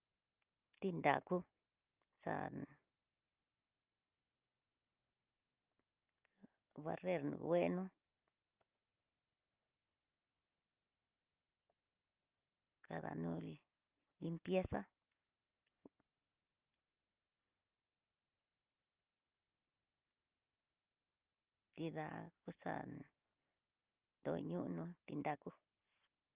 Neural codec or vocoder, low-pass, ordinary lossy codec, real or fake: none; 3.6 kHz; Opus, 32 kbps; real